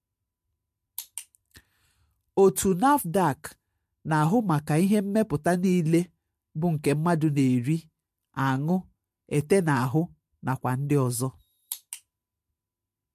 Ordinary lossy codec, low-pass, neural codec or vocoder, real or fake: MP3, 64 kbps; 14.4 kHz; none; real